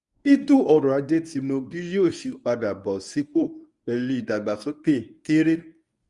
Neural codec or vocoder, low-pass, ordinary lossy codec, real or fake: codec, 24 kHz, 0.9 kbps, WavTokenizer, medium speech release version 1; 10.8 kHz; Opus, 64 kbps; fake